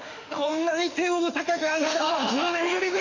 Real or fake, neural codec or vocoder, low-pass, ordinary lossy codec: fake; autoencoder, 48 kHz, 32 numbers a frame, DAC-VAE, trained on Japanese speech; 7.2 kHz; none